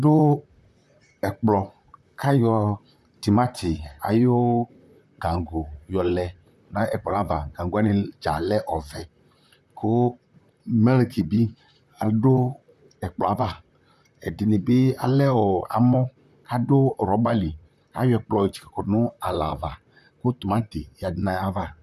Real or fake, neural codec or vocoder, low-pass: fake; vocoder, 44.1 kHz, 128 mel bands, Pupu-Vocoder; 14.4 kHz